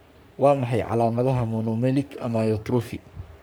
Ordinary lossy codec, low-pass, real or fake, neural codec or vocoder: none; none; fake; codec, 44.1 kHz, 3.4 kbps, Pupu-Codec